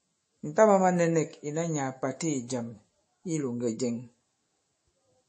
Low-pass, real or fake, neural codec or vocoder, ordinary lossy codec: 10.8 kHz; fake; autoencoder, 48 kHz, 128 numbers a frame, DAC-VAE, trained on Japanese speech; MP3, 32 kbps